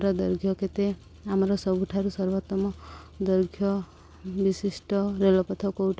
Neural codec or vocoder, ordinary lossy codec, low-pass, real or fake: none; none; none; real